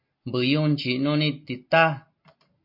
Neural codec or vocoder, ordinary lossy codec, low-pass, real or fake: none; MP3, 32 kbps; 5.4 kHz; real